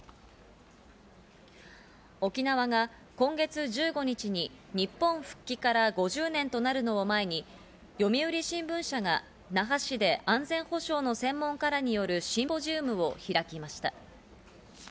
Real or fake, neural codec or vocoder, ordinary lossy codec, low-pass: real; none; none; none